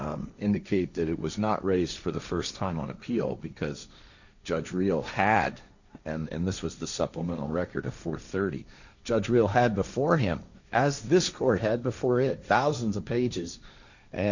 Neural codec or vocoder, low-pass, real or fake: codec, 16 kHz, 1.1 kbps, Voila-Tokenizer; 7.2 kHz; fake